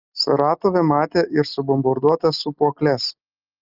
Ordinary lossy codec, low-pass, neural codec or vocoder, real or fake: Opus, 32 kbps; 5.4 kHz; none; real